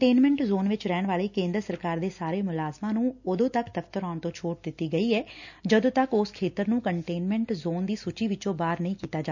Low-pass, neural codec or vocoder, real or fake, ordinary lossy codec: 7.2 kHz; none; real; none